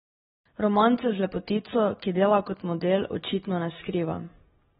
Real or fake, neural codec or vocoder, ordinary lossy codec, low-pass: real; none; AAC, 16 kbps; 9.9 kHz